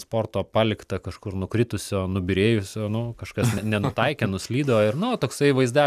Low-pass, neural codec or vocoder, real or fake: 14.4 kHz; vocoder, 44.1 kHz, 128 mel bands every 256 samples, BigVGAN v2; fake